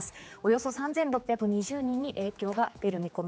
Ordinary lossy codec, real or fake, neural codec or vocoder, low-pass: none; fake; codec, 16 kHz, 4 kbps, X-Codec, HuBERT features, trained on general audio; none